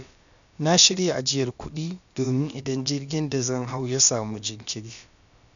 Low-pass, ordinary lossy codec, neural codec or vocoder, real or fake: 7.2 kHz; none; codec, 16 kHz, about 1 kbps, DyCAST, with the encoder's durations; fake